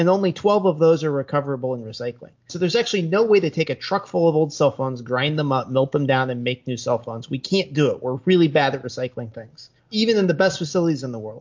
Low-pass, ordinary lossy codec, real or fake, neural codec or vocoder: 7.2 kHz; MP3, 48 kbps; real; none